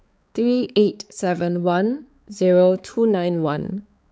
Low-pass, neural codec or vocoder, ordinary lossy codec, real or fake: none; codec, 16 kHz, 4 kbps, X-Codec, HuBERT features, trained on balanced general audio; none; fake